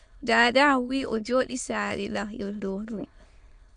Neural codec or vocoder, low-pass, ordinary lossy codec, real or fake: autoencoder, 22.05 kHz, a latent of 192 numbers a frame, VITS, trained on many speakers; 9.9 kHz; MP3, 64 kbps; fake